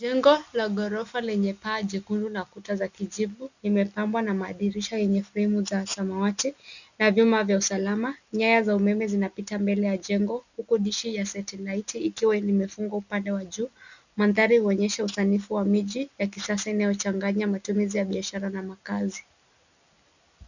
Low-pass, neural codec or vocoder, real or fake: 7.2 kHz; none; real